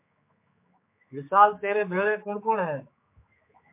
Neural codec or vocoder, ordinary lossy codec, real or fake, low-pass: codec, 16 kHz, 4 kbps, X-Codec, HuBERT features, trained on general audio; MP3, 24 kbps; fake; 3.6 kHz